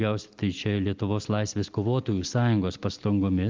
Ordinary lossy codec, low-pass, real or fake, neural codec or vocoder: Opus, 24 kbps; 7.2 kHz; real; none